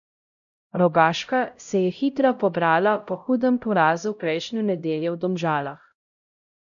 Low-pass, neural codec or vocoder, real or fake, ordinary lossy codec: 7.2 kHz; codec, 16 kHz, 0.5 kbps, X-Codec, HuBERT features, trained on LibriSpeech; fake; none